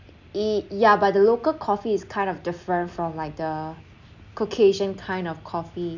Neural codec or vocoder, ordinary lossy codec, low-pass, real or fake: none; none; 7.2 kHz; real